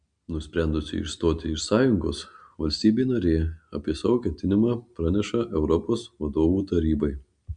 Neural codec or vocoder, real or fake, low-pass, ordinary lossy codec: none; real; 9.9 kHz; MP3, 64 kbps